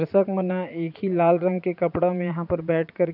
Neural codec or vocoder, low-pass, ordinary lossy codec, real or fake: vocoder, 44.1 kHz, 80 mel bands, Vocos; 5.4 kHz; none; fake